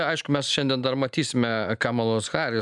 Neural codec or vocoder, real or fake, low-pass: none; real; 10.8 kHz